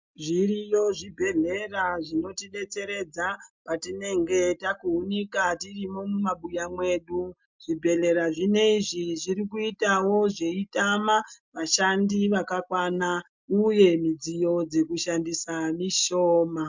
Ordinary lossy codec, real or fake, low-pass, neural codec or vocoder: MP3, 64 kbps; real; 7.2 kHz; none